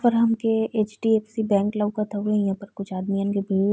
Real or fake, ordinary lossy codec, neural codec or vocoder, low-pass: real; none; none; none